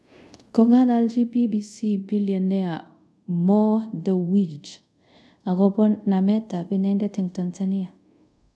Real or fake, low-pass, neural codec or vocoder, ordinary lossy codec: fake; none; codec, 24 kHz, 0.5 kbps, DualCodec; none